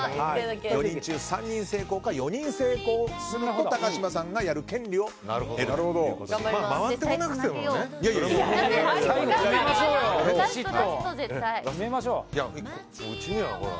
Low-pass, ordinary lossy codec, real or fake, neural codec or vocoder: none; none; real; none